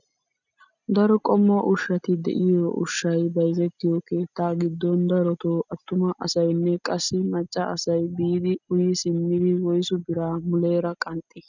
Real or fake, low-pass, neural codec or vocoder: real; 7.2 kHz; none